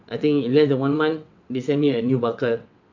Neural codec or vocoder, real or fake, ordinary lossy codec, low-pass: vocoder, 22.05 kHz, 80 mel bands, WaveNeXt; fake; AAC, 48 kbps; 7.2 kHz